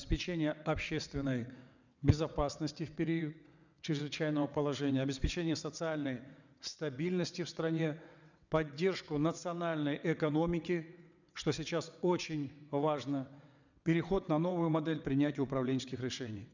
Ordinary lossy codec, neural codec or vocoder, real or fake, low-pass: none; vocoder, 22.05 kHz, 80 mel bands, WaveNeXt; fake; 7.2 kHz